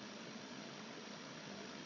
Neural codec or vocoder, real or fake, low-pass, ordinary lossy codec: codec, 16 kHz, 16 kbps, FreqCodec, larger model; fake; 7.2 kHz; none